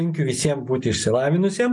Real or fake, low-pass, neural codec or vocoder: real; 10.8 kHz; none